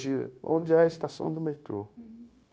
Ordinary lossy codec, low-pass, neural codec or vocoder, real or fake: none; none; codec, 16 kHz, 0.9 kbps, LongCat-Audio-Codec; fake